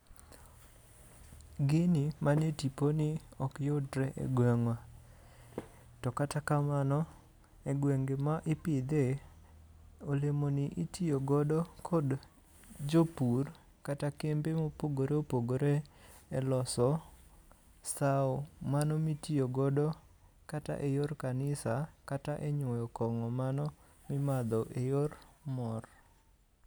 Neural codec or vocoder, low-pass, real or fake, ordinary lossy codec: none; none; real; none